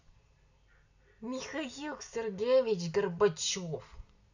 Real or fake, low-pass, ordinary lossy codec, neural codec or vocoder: real; 7.2 kHz; none; none